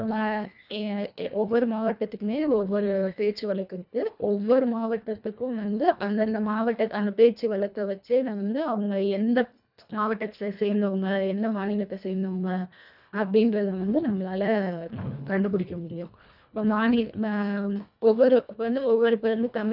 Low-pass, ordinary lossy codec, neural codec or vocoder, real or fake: 5.4 kHz; none; codec, 24 kHz, 1.5 kbps, HILCodec; fake